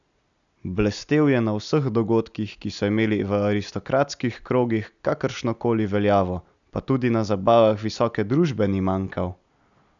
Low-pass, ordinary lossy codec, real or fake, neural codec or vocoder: 7.2 kHz; none; real; none